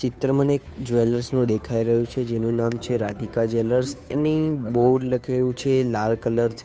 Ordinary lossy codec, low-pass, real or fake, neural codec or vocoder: none; none; fake; codec, 16 kHz, 2 kbps, FunCodec, trained on Chinese and English, 25 frames a second